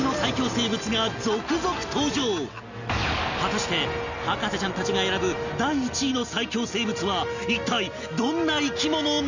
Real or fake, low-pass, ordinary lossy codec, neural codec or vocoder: real; 7.2 kHz; none; none